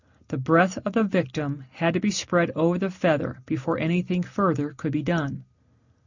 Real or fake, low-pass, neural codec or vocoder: real; 7.2 kHz; none